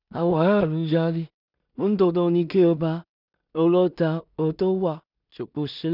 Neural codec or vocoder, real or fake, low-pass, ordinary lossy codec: codec, 16 kHz in and 24 kHz out, 0.4 kbps, LongCat-Audio-Codec, two codebook decoder; fake; 5.4 kHz; none